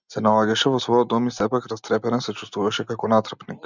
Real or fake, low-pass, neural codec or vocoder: real; 7.2 kHz; none